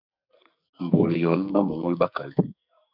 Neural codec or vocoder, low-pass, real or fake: codec, 44.1 kHz, 2.6 kbps, SNAC; 5.4 kHz; fake